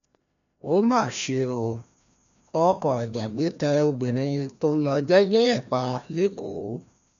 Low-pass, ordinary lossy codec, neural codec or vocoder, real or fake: 7.2 kHz; none; codec, 16 kHz, 1 kbps, FreqCodec, larger model; fake